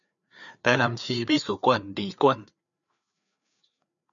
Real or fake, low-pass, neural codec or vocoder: fake; 7.2 kHz; codec, 16 kHz, 4 kbps, FreqCodec, larger model